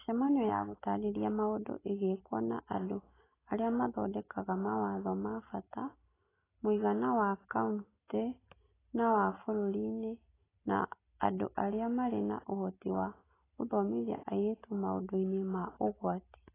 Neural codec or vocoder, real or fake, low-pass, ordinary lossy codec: none; real; 3.6 kHz; AAC, 16 kbps